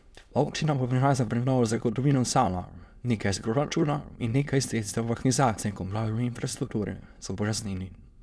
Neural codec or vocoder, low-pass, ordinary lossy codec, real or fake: autoencoder, 22.05 kHz, a latent of 192 numbers a frame, VITS, trained on many speakers; none; none; fake